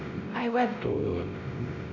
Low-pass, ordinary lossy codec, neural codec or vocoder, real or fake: 7.2 kHz; none; codec, 16 kHz, 0.5 kbps, X-Codec, WavLM features, trained on Multilingual LibriSpeech; fake